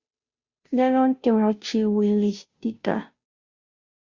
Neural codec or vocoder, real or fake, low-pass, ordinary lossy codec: codec, 16 kHz, 0.5 kbps, FunCodec, trained on Chinese and English, 25 frames a second; fake; 7.2 kHz; Opus, 64 kbps